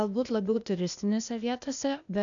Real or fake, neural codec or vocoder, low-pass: fake; codec, 16 kHz, 0.8 kbps, ZipCodec; 7.2 kHz